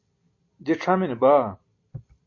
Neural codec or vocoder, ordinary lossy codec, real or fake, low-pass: none; MP3, 32 kbps; real; 7.2 kHz